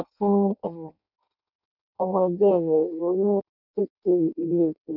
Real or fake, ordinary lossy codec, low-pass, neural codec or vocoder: fake; none; 5.4 kHz; codec, 16 kHz in and 24 kHz out, 0.6 kbps, FireRedTTS-2 codec